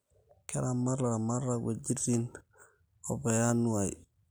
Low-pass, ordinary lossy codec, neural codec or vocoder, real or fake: none; none; none; real